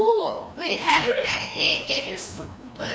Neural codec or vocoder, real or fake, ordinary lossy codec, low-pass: codec, 16 kHz, 1 kbps, FreqCodec, larger model; fake; none; none